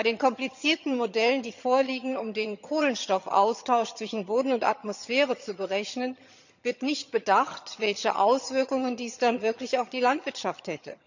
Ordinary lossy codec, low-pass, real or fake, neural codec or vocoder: none; 7.2 kHz; fake; vocoder, 22.05 kHz, 80 mel bands, HiFi-GAN